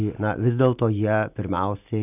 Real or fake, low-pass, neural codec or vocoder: real; 3.6 kHz; none